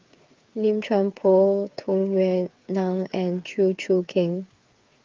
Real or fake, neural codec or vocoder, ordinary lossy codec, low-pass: fake; codec, 16 kHz, 8 kbps, FreqCodec, smaller model; Opus, 24 kbps; 7.2 kHz